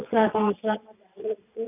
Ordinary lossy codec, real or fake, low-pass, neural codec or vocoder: none; fake; 3.6 kHz; vocoder, 22.05 kHz, 80 mel bands, WaveNeXt